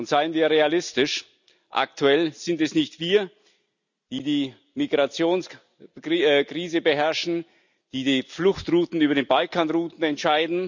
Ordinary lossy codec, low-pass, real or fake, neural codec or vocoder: none; 7.2 kHz; real; none